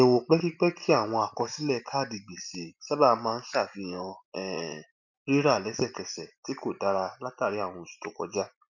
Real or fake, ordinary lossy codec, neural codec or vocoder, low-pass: real; none; none; 7.2 kHz